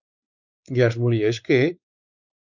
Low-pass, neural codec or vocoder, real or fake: 7.2 kHz; codec, 16 kHz, 4 kbps, X-Codec, WavLM features, trained on Multilingual LibriSpeech; fake